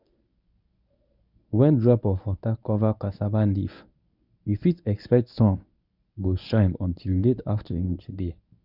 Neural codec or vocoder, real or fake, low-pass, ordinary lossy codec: codec, 24 kHz, 0.9 kbps, WavTokenizer, medium speech release version 1; fake; 5.4 kHz; none